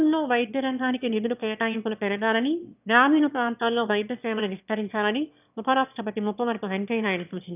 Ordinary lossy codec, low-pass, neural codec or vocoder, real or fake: none; 3.6 kHz; autoencoder, 22.05 kHz, a latent of 192 numbers a frame, VITS, trained on one speaker; fake